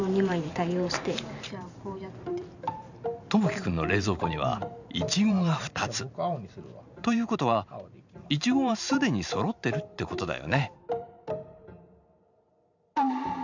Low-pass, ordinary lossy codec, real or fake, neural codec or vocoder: 7.2 kHz; none; fake; vocoder, 44.1 kHz, 128 mel bands every 512 samples, BigVGAN v2